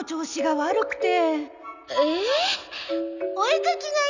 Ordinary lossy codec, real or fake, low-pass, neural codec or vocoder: none; real; 7.2 kHz; none